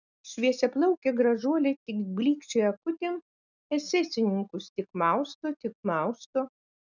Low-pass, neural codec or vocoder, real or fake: 7.2 kHz; none; real